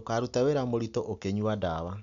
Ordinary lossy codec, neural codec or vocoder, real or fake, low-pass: none; none; real; 7.2 kHz